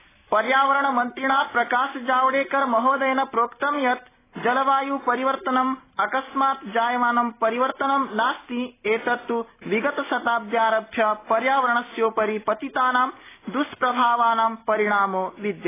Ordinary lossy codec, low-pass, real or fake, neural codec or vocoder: AAC, 16 kbps; 3.6 kHz; real; none